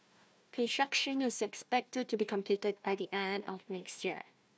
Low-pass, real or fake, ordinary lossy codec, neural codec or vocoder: none; fake; none; codec, 16 kHz, 1 kbps, FunCodec, trained on Chinese and English, 50 frames a second